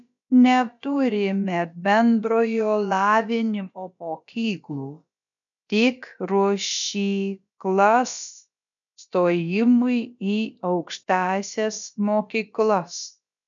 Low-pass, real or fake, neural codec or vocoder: 7.2 kHz; fake; codec, 16 kHz, about 1 kbps, DyCAST, with the encoder's durations